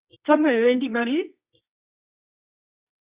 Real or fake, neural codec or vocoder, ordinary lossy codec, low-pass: fake; codec, 24 kHz, 0.9 kbps, WavTokenizer, medium music audio release; Opus, 64 kbps; 3.6 kHz